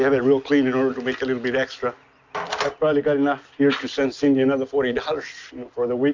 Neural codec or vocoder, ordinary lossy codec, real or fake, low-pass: none; MP3, 64 kbps; real; 7.2 kHz